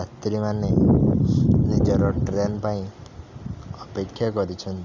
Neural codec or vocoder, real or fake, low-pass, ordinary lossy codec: none; real; 7.2 kHz; none